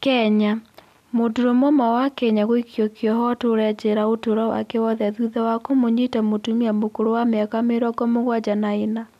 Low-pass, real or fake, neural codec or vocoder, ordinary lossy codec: 14.4 kHz; real; none; none